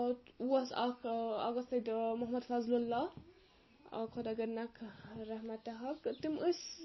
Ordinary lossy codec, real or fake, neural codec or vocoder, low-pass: MP3, 24 kbps; real; none; 7.2 kHz